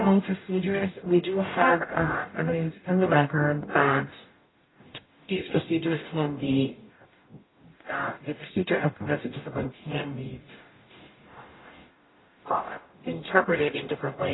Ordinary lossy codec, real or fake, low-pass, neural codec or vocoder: AAC, 16 kbps; fake; 7.2 kHz; codec, 44.1 kHz, 0.9 kbps, DAC